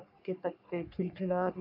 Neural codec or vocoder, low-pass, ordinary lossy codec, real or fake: codec, 44.1 kHz, 3.4 kbps, Pupu-Codec; 5.4 kHz; none; fake